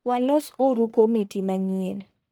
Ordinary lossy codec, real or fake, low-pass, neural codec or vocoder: none; fake; none; codec, 44.1 kHz, 1.7 kbps, Pupu-Codec